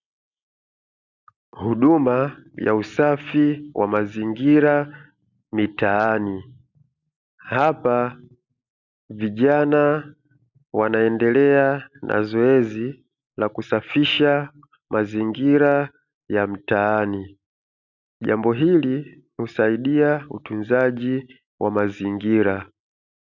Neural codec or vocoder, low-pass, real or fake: none; 7.2 kHz; real